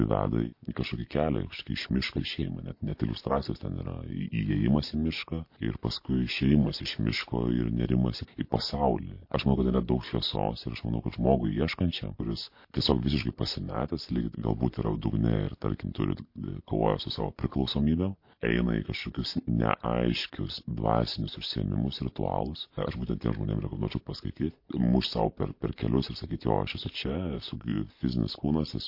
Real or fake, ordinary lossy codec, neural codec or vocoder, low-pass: real; MP3, 32 kbps; none; 5.4 kHz